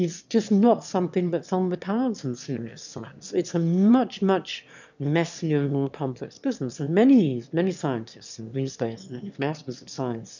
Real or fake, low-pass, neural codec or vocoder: fake; 7.2 kHz; autoencoder, 22.05 kHz, a latent of 192 numbers a frame, VITS, trained on one speaker